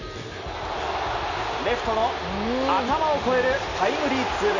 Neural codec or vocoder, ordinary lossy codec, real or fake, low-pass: none; none; real; 7.2 kHz